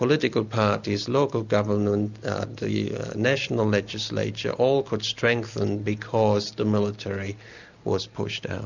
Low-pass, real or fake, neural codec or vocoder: 7.2 kHz; real; none